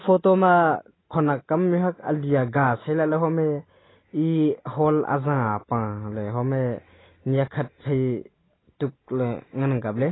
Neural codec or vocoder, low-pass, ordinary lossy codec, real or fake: none; 7.2 kHz; AAC, 16 kbps; real